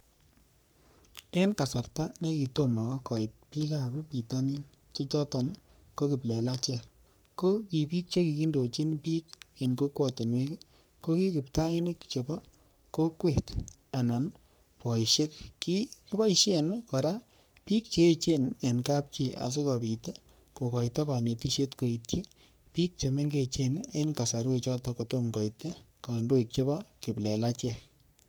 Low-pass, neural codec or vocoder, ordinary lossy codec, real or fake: none; codec, 44.1 kHz, 3.4 kbps, Pupu-Codec; none; fake